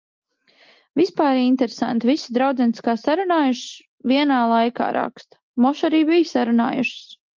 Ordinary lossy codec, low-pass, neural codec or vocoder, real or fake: Opus, 32 kbps; 7.2 kHz; none; real